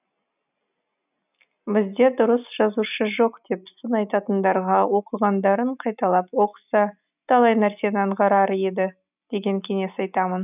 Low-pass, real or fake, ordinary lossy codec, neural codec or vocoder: 3.6 kHz; real; none; none